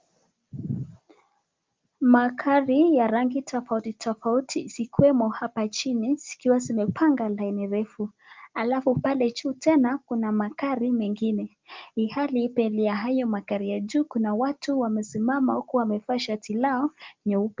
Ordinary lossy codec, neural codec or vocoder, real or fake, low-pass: Opus, 32 kbps; none; real; 7.2 kHz